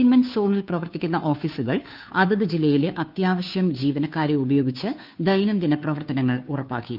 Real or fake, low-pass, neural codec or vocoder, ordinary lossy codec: fake; 5.4 kHz; codec, 16 kHz, 2 kbps, FunCodec, trained on Chinese and English, 25 frames a second; none